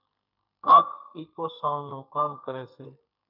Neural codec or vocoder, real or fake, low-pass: codec, 44.1 kHz, 2.6 kbps, SNAC; fake; 5.4 kHz